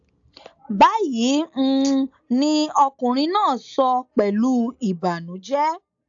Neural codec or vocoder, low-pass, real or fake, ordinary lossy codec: none; 7.2 kHz; real; AAC, 64 kbps